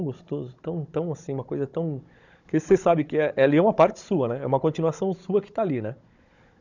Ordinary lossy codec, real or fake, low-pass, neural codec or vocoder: none; fake; 7.2 kHz; codec, 16 kHz, 16 kbps, FunCodec, trained on Chinese and English, 50 frames a second